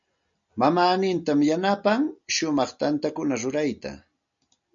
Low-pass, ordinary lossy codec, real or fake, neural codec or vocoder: 7.2 kHz; MP3, 64 kbps; real; none